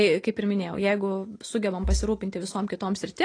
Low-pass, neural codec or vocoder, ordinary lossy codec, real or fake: 9.9 kHz; none; AAC, 32 kbps; real